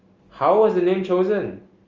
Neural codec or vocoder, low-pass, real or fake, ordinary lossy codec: none; 7.2 kHz; real; Opus, 32 kbps